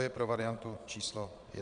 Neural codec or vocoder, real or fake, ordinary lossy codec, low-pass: vocoder, 22.05 kHz, 80 mel bands, Vocos; fake; AAC, 64 kbps; 9.9 kHz